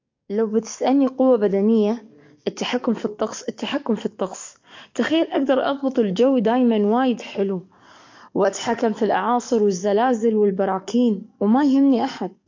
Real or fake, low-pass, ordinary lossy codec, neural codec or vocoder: fake; 7.2 kHz; MP3, 48 kbps; codec, 44.1 kHz, 7.8 kbps, DAC